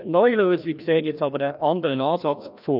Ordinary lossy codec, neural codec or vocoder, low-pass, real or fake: none; codec, 16 kHz, 1 kbps, FreqCodec, larger model; 5.4 kHz; fake